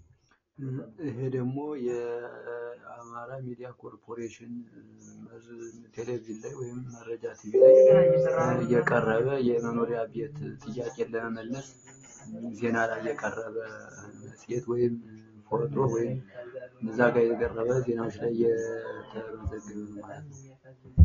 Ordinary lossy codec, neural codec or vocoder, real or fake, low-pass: AAC, 32 kbps; none; real; 7.2 kHz